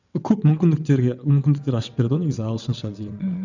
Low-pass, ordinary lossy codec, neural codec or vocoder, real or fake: 7.2 kHz; none; vocoder, 22.05 kHz, 80 mel bands, WaveNeXt; fake